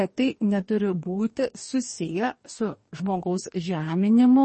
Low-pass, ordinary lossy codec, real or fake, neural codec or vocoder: 10.8 kHz; MP3, 32 kbps; fake; codec, 44.1 kHz, 2.6 kbps, DAC